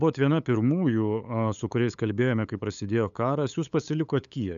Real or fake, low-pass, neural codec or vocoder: fake; 7.2 kHz; codec, 16 kHz, 8 kbps, FunCodec, trained on LibriTTS, 25 frames a second